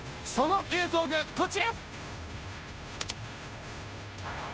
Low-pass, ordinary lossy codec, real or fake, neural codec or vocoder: none; none; fake; codec, 16 kHz, 0.5 kbps, FunCodec, trained on Chinese and English, 25 frames a second